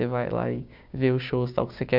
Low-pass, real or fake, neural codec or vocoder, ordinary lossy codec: 5.4 kHz; fake; codec, 16 kHz, 6 kbps, DAC; MP3, 48 kbps